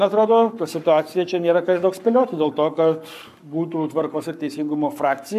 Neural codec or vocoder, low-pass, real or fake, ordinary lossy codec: codec, 44.1 kHz, 7.8 kbps, Pupu-Codec; 14.4 kHz; fake; AAC, 96 kbps